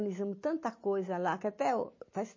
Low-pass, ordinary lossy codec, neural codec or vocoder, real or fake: 7.2 kHz; MP3, 32 kbps; none; real